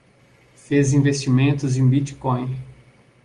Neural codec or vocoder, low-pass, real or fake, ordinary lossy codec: none; 10.8 kHz; real; Opus, 32 kbps